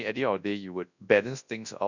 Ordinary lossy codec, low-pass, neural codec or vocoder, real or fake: none; 7.2 kHz; codec, 24 kHz, 0.9 kbps, WavTokenizer, large speech release; fake